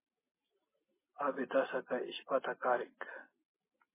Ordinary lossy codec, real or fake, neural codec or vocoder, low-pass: MP3, 16 kbps; real; none; 3.6 kHz